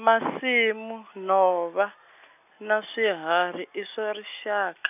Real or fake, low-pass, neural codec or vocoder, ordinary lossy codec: real; 3.6 kHz; none; none